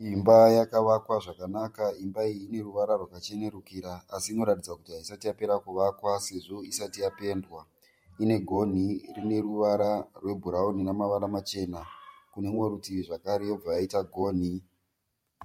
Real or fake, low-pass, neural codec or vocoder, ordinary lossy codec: fake; 19.8 kHz; vocoder, 44.1 kHz, 128 mel bands every 512 samples, BigVGAN v2; MP3, 64 kbps